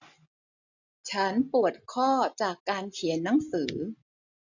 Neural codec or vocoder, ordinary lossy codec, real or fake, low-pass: none; none; real; 7.2 kHz